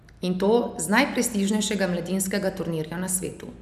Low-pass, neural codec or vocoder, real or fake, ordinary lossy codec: 14.4 kHz; none; real; none